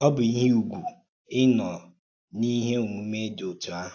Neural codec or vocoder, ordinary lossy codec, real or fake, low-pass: none; AAC, 48 kbps; real; 7.2 kHz